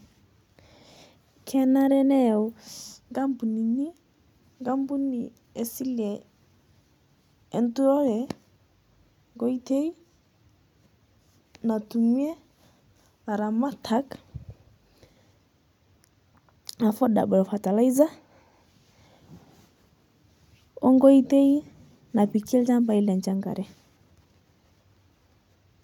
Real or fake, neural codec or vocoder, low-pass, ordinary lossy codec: real; none; 19.8 kHz; none